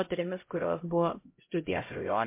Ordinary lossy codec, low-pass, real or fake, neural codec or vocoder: MP3, 24 kbps; 3.6 kHz; fake; codec, 16 kHz, 0.5 kbps, X-Codec, HuBERT features, trained on LibriSpeech